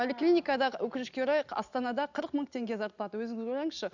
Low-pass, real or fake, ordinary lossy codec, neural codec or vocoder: 7.2 kHz; real; none; none